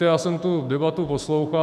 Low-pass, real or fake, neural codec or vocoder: 14.4 kHz; fake; autoencoder, 48 kHz, 128 numbers a frame, DAC-VAE, trained on Japanese speech